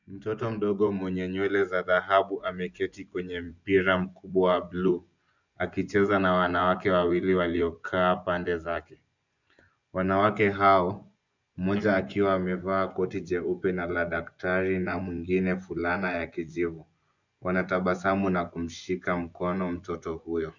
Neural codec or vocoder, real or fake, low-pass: vocoder, 24 kHz, 100 mel bands, Vocos; fake; 7.2 kHz